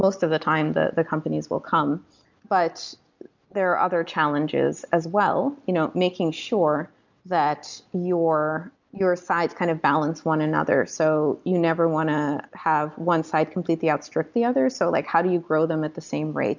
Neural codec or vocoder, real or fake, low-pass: none; real; 7.2 kHz